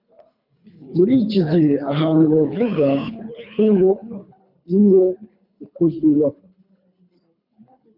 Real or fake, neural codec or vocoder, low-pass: fake; codec, 24 kHz, 3 kbps, HILCodec; 5.4 kHz